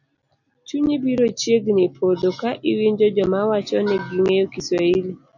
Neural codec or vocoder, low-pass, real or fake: none; 7.2 kHz; real